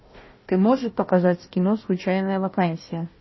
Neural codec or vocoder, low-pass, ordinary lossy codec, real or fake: codec, 16 kHz, 1 kbps, FunCodec, trained on Chinese and English, 50 frames a second; 7.2 kHz; MP3, 24 kbps; fake